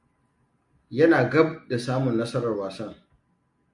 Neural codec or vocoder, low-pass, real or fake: none; 10.8 kHz; real